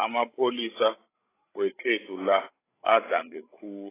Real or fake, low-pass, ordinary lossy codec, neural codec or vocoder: fake; 3.6 kHz; AAC, 16 kbps; codec, 16 kHz, 16 kbps, FreqCodec, larger model